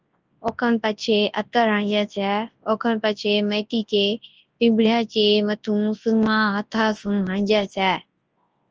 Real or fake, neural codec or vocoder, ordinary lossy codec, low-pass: fake; codec, 24 kHz, 0.9 kbps, WavTokenizer, large speech release; Opus, 24 kbps; 7.2 kHz